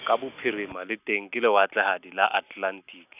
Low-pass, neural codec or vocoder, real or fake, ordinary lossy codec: 3.6 kHz; none; real; none